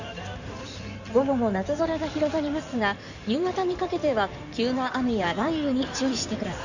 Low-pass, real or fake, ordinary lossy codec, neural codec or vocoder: 7.2 kHz; fake; none; codec, 16 kHz in and 24 kHz out, 2.2 kbps, FireRedTTS-2 codec